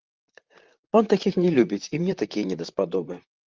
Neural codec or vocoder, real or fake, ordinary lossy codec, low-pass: vocoder, 44.1 kHz, 128 mel bands, Pupu-Vocoder; fake; Opus, 24 kbps; 7.2 kHz